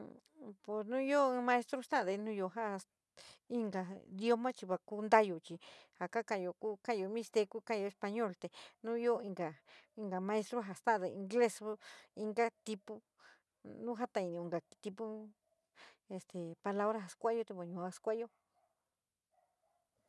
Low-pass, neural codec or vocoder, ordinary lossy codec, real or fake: none; none; none; real